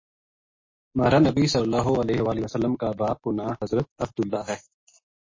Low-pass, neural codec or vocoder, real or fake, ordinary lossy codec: 7.2 kHz; none; real; MP3, 32 kbps